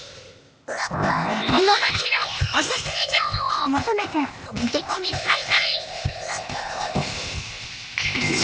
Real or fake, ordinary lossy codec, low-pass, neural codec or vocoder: fake; none; none; codec, 16 kHz, 0.8 kbps, ZipCodec